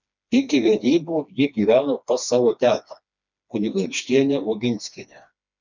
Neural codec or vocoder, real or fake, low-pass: codec, 16 kHz, 2 kbps, FreqCodec, smaller model; fake; 7.2 kHz